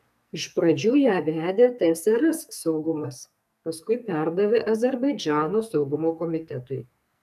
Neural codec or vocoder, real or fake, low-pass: codec, 44.1 kHz, 2.6 kbps, SNAC; fake; 14.4 kHz